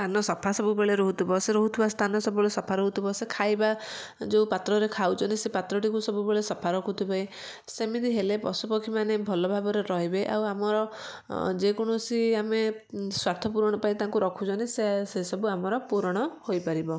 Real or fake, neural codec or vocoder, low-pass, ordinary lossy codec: real; none; none; none